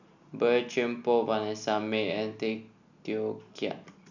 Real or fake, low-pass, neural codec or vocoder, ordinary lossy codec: real; 7.2 kHz; none; none